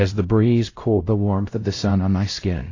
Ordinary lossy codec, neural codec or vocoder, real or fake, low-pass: AAC, 32 kbps; codec, 16 kHz, 0.5 kbps, X-Codec, WavLM features, trained on Multilingual LibriSpeech; fake; 7.2 kHz